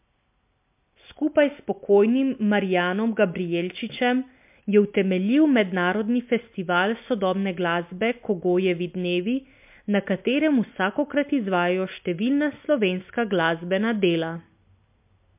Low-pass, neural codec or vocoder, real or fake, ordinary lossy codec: 3.6 kHz; none; real; MP3, 32 kbps